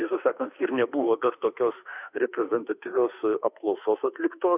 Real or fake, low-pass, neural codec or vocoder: fake; 3.6 kHz; autoencoder, 48 kHz, 32 numbers a frame, DAC-VAE, trained on Japanese speech